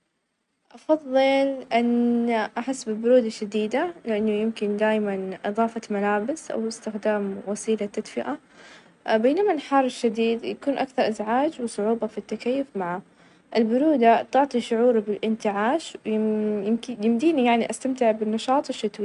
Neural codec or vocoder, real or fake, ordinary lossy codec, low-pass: none; real; none; 10.8 kHz